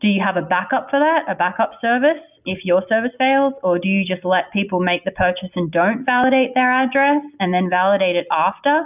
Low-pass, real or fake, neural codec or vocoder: 3.6 kHz; real; none